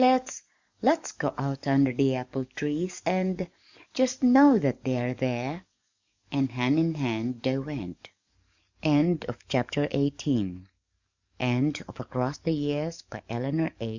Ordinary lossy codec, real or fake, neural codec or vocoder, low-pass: Opus, 64 kbps; fake; codec, 44.1 kHz, 7.8 kbps, DAC; 7.2 kHz